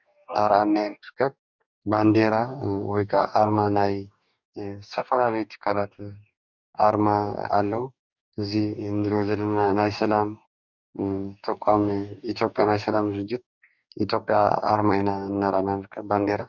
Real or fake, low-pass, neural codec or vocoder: fake; 7.2 kHz; codec, 44.1 kHz, 2.6 kbps, DAC